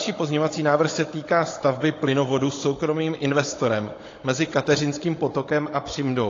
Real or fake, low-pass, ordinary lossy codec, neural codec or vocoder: fake; 7.2 kHz; AAC, 32 kbps; codec, 16 kHz, 16 kbps, FunCodec, trained on Chinese and English, 50 frames a second